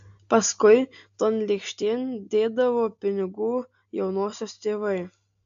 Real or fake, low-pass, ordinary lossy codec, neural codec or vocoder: real; 7.2 kHz; AAC, 64 kbps; none